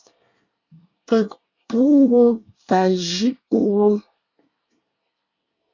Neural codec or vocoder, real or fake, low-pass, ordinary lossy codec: codec, 24 kHz, 1 kbps, SNAC; fake; 7.2 kHz; MP3, 64 kbps